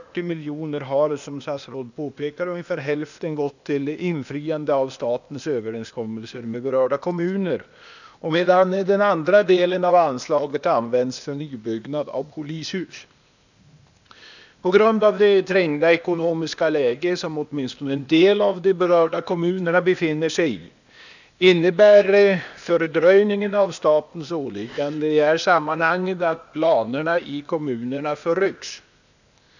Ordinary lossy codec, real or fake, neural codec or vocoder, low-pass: none; fake; codec, 16 kHz, 0.8 kbps, ZipCodec; 7.2 kHz